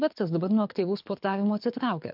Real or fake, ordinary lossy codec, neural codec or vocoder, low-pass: fake; MP3, 48 kbps; codec, 24 kHz, 6 kbps, HILCodec; 5.4 kHz